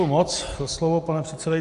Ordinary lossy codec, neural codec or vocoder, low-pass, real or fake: AAC, 64 kbps; none; 10.8 kHz; real